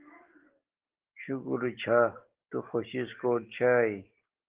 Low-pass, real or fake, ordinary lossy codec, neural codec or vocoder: 3.6 kHz; real; Opus, 16 kbps; none